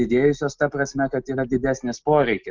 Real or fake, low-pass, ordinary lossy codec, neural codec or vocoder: real; 7.2 kHz; Opus, 32 kbps; none